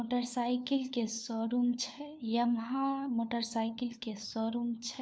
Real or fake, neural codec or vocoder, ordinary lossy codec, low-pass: fake; codec, 16 kHz, 4 kbps, FunCodec, trained on LibriTTS, 50 frames a second; none; none